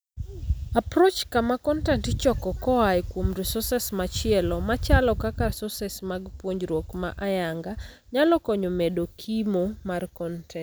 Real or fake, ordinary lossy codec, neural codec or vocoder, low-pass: real; none; none; none